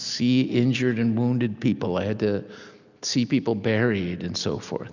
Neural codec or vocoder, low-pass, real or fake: none; 7.2 kHz; real